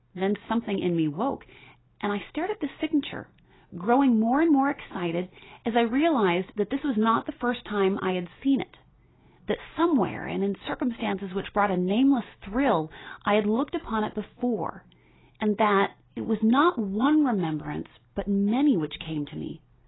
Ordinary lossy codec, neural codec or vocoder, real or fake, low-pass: AAC, 16 kbps; none; real; 7.2 kHz